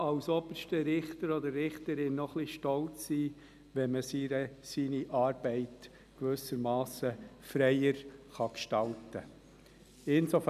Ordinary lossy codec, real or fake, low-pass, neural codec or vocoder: none; real; 14.4 kHz; none